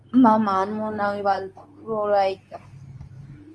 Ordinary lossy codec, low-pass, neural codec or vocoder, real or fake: Opus, 32 kbps; 10.8 kHz; codec, 24 kHz, 0.9 kbps, WavTokenizer, medium speech release version 2; fake